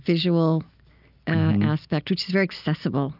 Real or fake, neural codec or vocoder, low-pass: real; none; 5.4 kHz